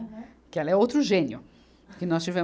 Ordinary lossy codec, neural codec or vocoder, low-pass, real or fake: none; none; none; real